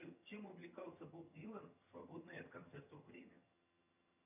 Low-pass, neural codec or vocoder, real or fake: 3.6 kHz; vocoder, 22.05 kHz, 80 mel bands, HiFi-GAN; fake